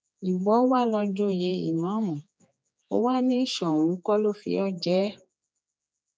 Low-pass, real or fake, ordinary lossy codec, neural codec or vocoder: none; fake; none; codec, 16 kHz, 4 kbps, X-Codec, HuBERT features, trained on general audio